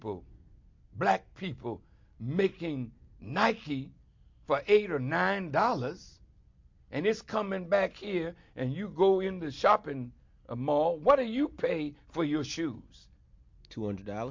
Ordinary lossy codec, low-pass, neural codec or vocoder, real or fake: MP3, 48 kbps; 7.2 kHz; none; real